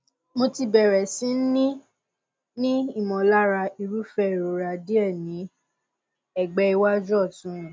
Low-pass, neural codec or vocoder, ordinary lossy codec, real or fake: none; none; none; real